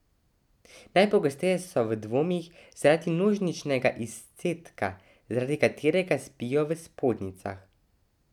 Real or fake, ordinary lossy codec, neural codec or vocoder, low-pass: real; none; none; 19.8 kHz